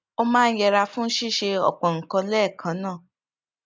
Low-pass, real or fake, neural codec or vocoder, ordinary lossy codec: none; real; none; none